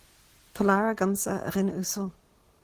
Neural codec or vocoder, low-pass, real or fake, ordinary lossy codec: none; 14.4 kHz; real; Opus, 16 kbps